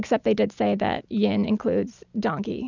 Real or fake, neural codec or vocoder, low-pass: real; none; 7.2 kHz